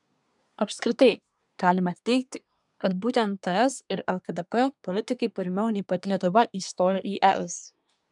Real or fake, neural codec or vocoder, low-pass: fake; codec, 24 kHz, 1 kbps, SNAC; 10.8 kHz